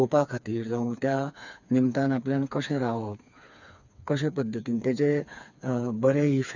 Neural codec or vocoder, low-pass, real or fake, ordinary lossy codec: codec, 16 kHz, 4 kbps, FreqCodec, smaller model; 7.2 kHz; fake; none